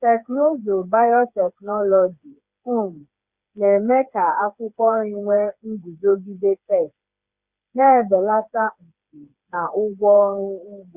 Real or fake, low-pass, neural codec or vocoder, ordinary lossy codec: fake; 3.6 kHz; codec, 16 kHz, 4 kbps, FreqCodec, smaller model; Opus, 64 kbps